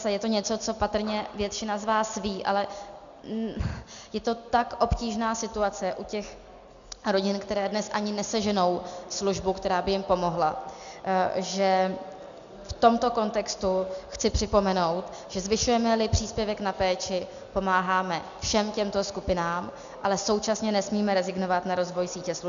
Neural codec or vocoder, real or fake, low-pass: none; real; 7.2 kHz